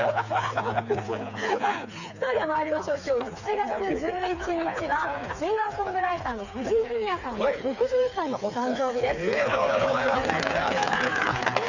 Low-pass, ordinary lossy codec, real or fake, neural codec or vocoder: 7.2 kHz; none; fake; codec, 16 kHz, 4 kbps, FreqCodec, smaller model